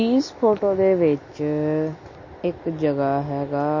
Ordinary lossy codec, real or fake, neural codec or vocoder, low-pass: MP3, 32 kbps; real; none; 7.2 kHz